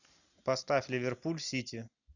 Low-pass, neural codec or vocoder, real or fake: 7.2 kHz; none; real